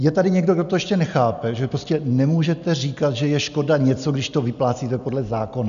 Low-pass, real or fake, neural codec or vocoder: 7.2 kHz; real; none